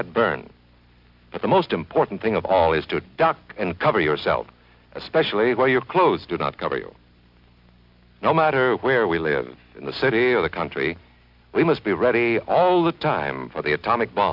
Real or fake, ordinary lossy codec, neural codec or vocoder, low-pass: real; Opus, 64 kbps; none; 5.4 kHz